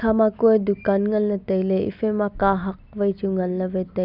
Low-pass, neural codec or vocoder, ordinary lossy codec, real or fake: 5.4 kHz; none; none; real